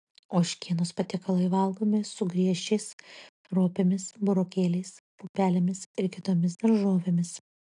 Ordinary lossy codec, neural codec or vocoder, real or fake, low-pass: AAC, 64 kbps; none; real; 10.8 kHz